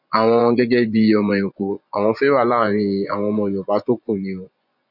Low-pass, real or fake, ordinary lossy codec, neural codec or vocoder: 5.4 kHz; fake; none; vocoder, 24 kHz, 100 mel bands, Vocos